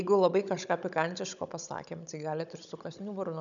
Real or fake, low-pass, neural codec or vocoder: fake; 7.2 kHz; codec, 16 kHz, 16 kbps, FunCodec, trained on Chinese and English, 50 frames a second